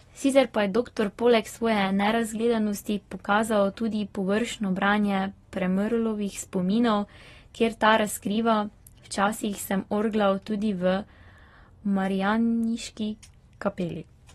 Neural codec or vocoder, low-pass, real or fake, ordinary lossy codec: none; 19.8 kHz; real; AAC, 32 kbps